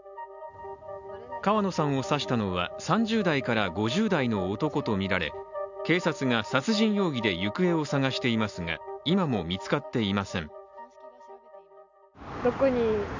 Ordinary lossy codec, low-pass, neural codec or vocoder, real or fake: none; 7.2 kHz; none; real